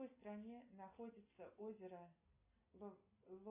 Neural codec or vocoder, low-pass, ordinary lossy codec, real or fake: none; 3.6 kHz; MP3, 24 kbps; real